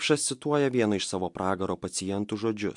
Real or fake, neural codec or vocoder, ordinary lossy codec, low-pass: real; none; MP3, 64 kbps; 10.8 kHz